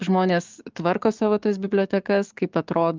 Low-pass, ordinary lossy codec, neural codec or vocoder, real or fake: 7.2 kHz; Opus, 32 kbps; codec, 44.1 kHz, 7.8 kbps, DAC; fake